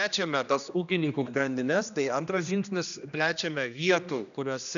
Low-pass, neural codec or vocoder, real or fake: 7.2 kHz; codec, 16 kHz, 1 kbps, X-Codec, HuBERT features, trained on general audio; fake